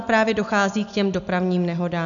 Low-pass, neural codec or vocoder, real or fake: 7.2 kHz; none; real